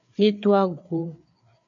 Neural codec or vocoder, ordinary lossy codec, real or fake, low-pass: codec, 16 kHz, 4 kbps, FreqCodec, larger model; MP3, 96 kbps; fake; 7.2 kHz